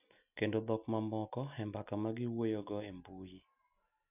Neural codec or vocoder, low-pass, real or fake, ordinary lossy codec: none; 3.6 kHz; real; none